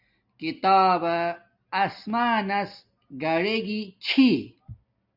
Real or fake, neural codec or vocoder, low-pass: real; none; 5.4 kHz